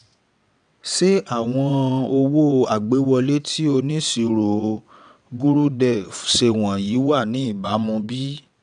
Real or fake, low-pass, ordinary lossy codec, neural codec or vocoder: fake; 9.9 kHz; none; vocoder, 22.05 kHz, 80 mel bands, WaveNeXt